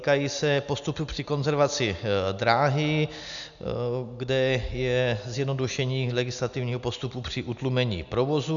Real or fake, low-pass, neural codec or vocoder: real; 7.2 kHz; none